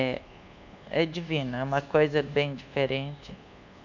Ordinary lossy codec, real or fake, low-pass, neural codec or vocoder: none; fake; 7.2 kHz; codec, 24 kHz, 1.2 kbps, DualCodec